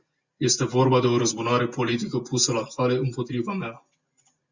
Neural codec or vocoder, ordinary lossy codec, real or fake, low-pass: none; Opus, 64 kbps; real; 7.2 kHz